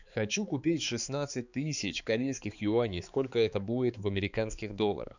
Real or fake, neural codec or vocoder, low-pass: fake; codec, 16 kHz, 4 kbps, X-Codec, HuBERT features, trained on balanced general audio; 7.2 kHz